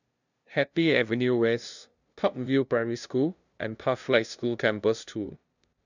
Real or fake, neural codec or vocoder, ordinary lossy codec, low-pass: fake; codec, 16 kHz, 0.5 kbps, FunCodec, trained on LibriTTS, 25 frames a second; none; 7.2 kHz